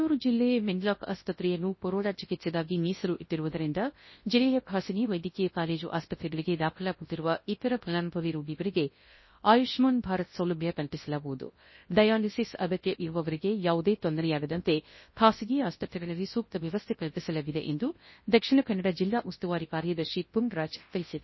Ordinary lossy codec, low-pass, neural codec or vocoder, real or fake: MP3, 24 kbps; 7.2 kHz; codec, 24 kHz, 0.9 kbps, WavTokenizer, large speech release; fake